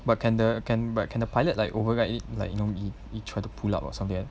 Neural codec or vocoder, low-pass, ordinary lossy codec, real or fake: none; none; none; real